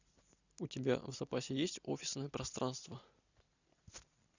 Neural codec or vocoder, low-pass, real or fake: none; 7.2 kHz; real